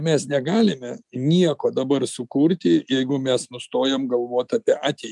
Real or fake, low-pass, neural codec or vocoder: real; 10.8 kHz; none